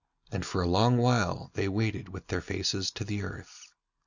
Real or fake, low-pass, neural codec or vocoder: real; 7.2 kHz; none